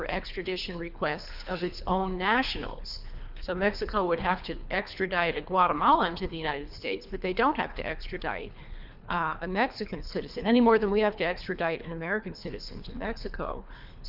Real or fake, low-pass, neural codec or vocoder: fake; 5.4 kHz; codec, 24 kHz, 3 kbps, HILCodec